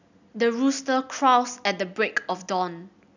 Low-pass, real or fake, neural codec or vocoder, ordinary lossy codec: 7.2 kHz; real; none; none